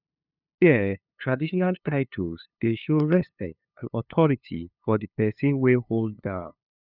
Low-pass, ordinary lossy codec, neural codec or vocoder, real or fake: 5.4 kHz; none; codec, 16 kHz, 2 kbps, FunCodec, trained on LibriTTS, 25 frames a second; fake